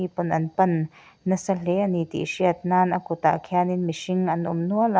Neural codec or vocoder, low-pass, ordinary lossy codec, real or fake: none; none; none; real